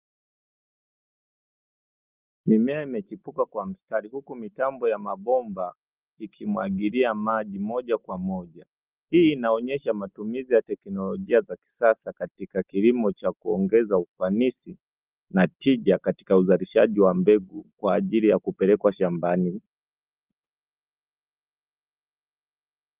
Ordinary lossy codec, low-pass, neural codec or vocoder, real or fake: Opus, 32 kbps; 3.6 kHz; none; real